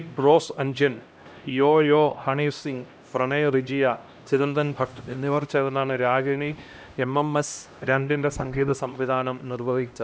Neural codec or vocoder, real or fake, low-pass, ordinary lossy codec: codec, 16 kHz, 1 kbps, X-Codec, HuBERT features, trained on LibriSpeech; fake; none; none